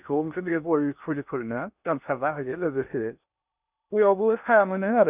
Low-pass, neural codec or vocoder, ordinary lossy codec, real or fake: 3.6 kHz; codec, 16 kHz in and 24 kHz out, 0.8 kbps, FocalCodec, streaming, 65536 codes; none; fake